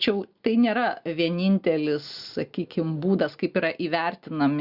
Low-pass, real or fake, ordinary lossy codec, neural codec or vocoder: 5.4 kHz; real; Opus, 64 kbps; none